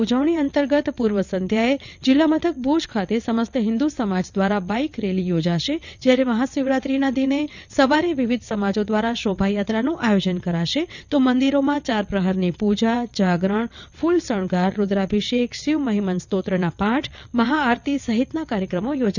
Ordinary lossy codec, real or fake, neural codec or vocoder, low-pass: none; fake; vocoder, 22.05 kHz, 80 mel bands, WaveNeXt; 7.2 kHz